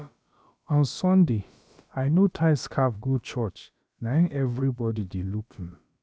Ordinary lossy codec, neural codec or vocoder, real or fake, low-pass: none; codec, 16 kHz, about 1 kbps, DyCAST, with the encoder's durations; fake; none